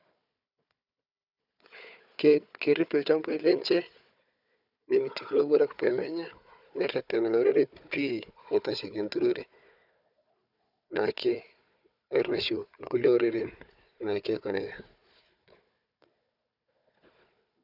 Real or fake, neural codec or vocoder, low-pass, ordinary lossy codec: fake; codec, 16 kHz, 4 kbps, FunCodec, trained on Chinese and English, 50 frames a second; 5.4 kHz; none